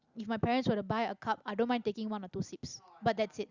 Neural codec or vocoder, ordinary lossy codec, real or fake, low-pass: none; Opus, 64 kbps; real; 7.2 kHz